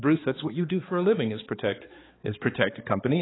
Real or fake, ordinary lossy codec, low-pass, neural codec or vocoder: fake; AAC, 16 kbps; 7.2 kHz; codec, 16 kHz, 4 kbps, X-Codec, HuBERT features, trained on balanced general audio